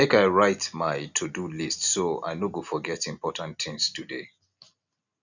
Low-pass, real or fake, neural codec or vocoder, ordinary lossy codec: 7.2 kHz; real; none; none